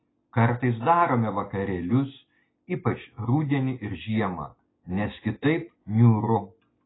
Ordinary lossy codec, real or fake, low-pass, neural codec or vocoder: AAC, 16 kbps; real; 7.2 kHz; none